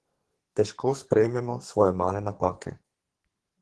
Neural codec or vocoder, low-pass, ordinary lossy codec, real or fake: codec, 44.1 kHz, 2.6 kbps, SNAC; 10.8 kHz; Opus, 16 kbps; fake